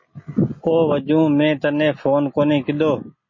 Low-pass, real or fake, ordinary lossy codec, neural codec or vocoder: 7.2 kHz; real; MP3, 32 kbps; none